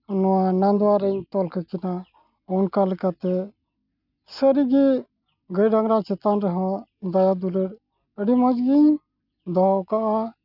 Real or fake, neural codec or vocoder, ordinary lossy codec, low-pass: real; none; none; 5.4 kHz